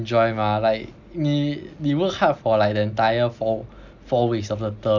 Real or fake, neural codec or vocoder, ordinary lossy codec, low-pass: real; none; none; 7.2 kHz